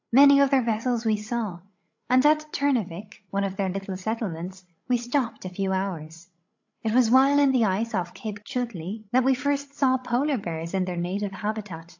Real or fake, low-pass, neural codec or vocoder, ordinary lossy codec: fake; 7.2 kHz; codec, 16 kHz, 16 kbps, FreqCodec, larger model; AAC, 48 kbps